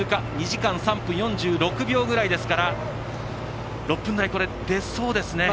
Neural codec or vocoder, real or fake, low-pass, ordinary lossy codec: none; real; none; none